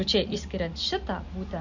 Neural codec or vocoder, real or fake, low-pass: none; real; 7.2 kHz